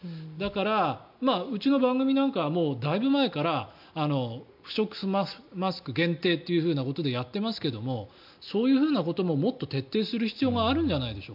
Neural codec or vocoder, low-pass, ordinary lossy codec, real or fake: none; 5.4 kHz; MP3, 48 kbps; real